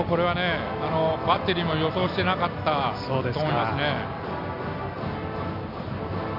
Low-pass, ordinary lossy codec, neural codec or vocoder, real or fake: 5.4 kHz; none; none; real